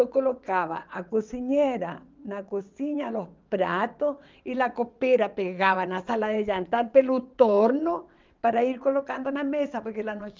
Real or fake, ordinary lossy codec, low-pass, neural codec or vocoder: fake; Opus, 24 kbps; 7.2 kHz; vocoder, 22.05 kHz, 80 mel bands, WaveNeXt